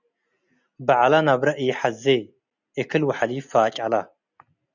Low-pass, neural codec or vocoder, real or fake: 7.2 kHz; none; real